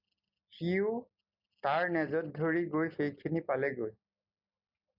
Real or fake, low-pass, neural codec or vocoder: real; 5.4 kHz; none